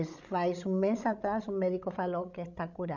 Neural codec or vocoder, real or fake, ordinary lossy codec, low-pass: codec, 16 kHz, 16 kbps, FreqCodec, larger model; fake; none; 7.2 kHz